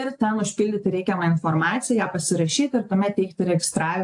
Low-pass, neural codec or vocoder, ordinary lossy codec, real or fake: 10.8 kHz; none; AAC, 64 kbps; real